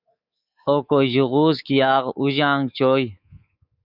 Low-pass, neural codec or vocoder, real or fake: 5.4 kHz; codec, 24 kHz, 3.1 kbps, DualCodec; fake